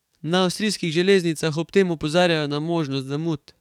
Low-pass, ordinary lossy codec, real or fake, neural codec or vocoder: 19.8 kHz; none; fake; codec, 44.1 kHz, 7.8 kbps, DAC